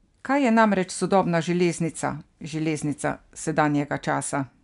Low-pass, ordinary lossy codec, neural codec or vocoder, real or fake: 10.8 kHz; none; none; real